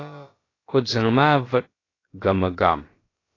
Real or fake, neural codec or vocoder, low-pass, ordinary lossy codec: fake; codec, 16 kHz, about 1 kbps, DyCAST, with the encoder's durations; 7.2 kHz; AAC, 32 kbps